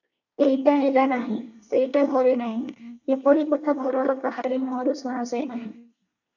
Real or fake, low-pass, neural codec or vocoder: fake; 7.2 kHz; codec, 24 kHz, 1 kbps, SNAC